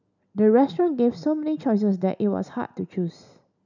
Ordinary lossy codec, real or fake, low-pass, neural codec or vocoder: none; real; 7.2 kHz; none